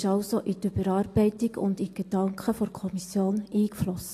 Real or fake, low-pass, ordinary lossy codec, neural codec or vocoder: real; 14.4 kHz; AAC, 48 kbps; none